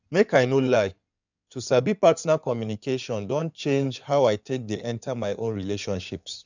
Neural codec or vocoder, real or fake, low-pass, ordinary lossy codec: codec, 16 kHz in and 24 kHz out, 2.2 kbps, FireRedTTS-2 codec; fake; 7.2 kHz; none